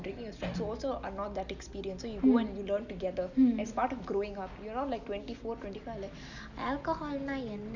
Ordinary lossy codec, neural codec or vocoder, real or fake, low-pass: none; none; real; 7.2 kHz